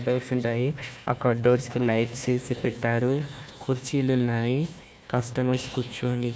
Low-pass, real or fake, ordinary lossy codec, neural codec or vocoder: none; fake; none; codec, 16 kHz, 1 kbps, FunCodec, trained on Chinese and English, 50 frames a second